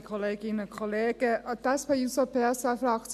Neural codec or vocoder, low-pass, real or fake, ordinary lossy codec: none; 14.4 kHz; real; AAC, 96 kbps